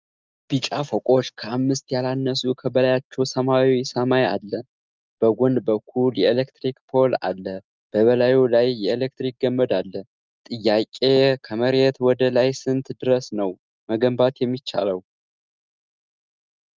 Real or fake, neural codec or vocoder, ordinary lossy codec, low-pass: real; none; Opus, 24 kbps; 7.2 kHz